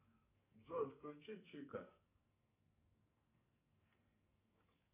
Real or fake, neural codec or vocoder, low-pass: fake; codec, 44.1 kHz, 2.6 kbps, SNAC; 3.6 kHz